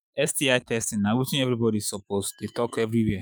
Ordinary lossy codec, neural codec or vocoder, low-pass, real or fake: none; autoencoder, 48 kHz, 128 numbers a frame, DAC-VAE, trained on Japanese speech; none; fake